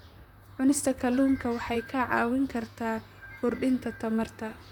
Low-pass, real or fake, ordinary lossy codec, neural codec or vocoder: 19.8 kHz; fake; none; vocoder, 44.1 kHz, 128 mel bands, Pupu-Vocoder